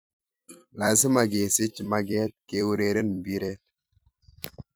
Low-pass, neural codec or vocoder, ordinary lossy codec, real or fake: none; vocoder, 44.1 kHz, 128 mel bands every 256 samples, BigVGAN v2; none; fake